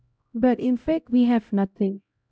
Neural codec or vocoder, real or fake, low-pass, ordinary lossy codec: codec, 16 kHz, 0.5 kbps, X-Codec, HuBERT features, trained on LibriSpeech; fake; none; none